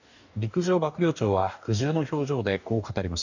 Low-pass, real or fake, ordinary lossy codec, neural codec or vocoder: 7.2 kHz; fake; none; codec, 44.1 kHz, 2.6 kbps, DAC